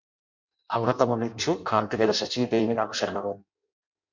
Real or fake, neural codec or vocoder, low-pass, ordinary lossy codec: fake; codec, 16 kHz in and 24 kHz out, 0.6 kbps, FireRedTTS-2 codec; 7.2 kHz; MP3, 48 kbps